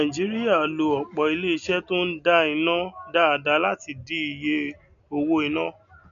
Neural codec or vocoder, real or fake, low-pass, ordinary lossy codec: none; real; 7.2 kHz; none